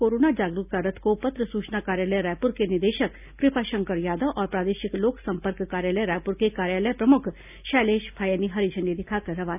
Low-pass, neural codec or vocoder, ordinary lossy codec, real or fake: 3.6 kHz; none; none; real